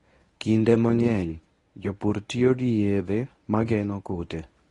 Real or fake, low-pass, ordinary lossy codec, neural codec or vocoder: fake; 10.8 kHz; AAC, 32 kbps; codec, 24 kHz, 0.9 kbps, WavTokenizer, medium speech release version 1